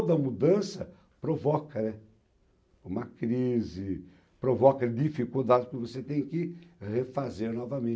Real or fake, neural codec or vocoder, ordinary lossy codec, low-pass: real; none; none; none